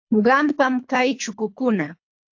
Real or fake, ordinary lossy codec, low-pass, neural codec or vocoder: fake; AAC, 48 kbps; 7.2 kHz; codec, 24 kHz, 3 kbps, HILCodec